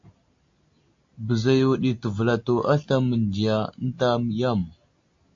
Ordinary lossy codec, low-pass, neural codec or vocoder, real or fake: AAC, 48 kbps; 7.2 kHz; none; real